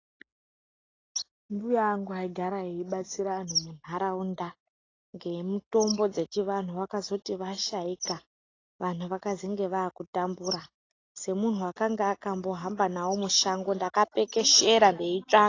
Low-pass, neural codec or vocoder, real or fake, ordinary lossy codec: 7.2 kHz; none; real; AAC, 32 kbps